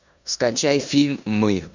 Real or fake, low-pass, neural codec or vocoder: fake; 7.2 kHz; codec, 16 kHz in and 24 kHz out, 0.9 kbps, LongCat-Audio-Codec, four codebook decoder